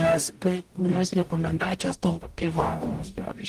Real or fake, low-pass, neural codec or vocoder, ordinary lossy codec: fake; 14.4 kHz; codec, 44.1 kHz, 0.9 kbps, DAC; Opus, 24 kbps